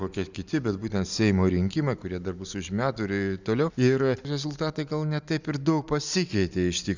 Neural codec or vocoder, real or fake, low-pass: none; real; 7.2 kHz